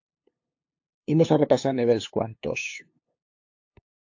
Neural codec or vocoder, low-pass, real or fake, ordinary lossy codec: codec, 16 kHz, 2 kbps, FunCodec, trained on LibriTTS, 25 frames a second; 7.2 kHz; fake; AAC, 48 kbps